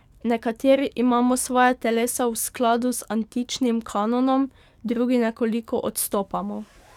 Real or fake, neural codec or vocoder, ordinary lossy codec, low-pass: fake; codec, 44.1 kHz, 7.8 kbps, DAC; none; 19.8 kHz